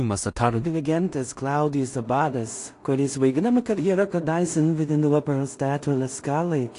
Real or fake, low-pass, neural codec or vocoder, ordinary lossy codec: fake; 10.8 kHz; codec, 16 kHz in and 24 kHz out, 0.4 kbps, LongCat-Audio-Codec, two codebook decoder; AAC, 48 kbps